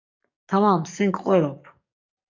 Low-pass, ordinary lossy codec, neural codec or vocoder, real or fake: 7.2 kHz; MP3, 64 kbps; codec, 44.1 kHz, 7.8 kbps, DAC; fake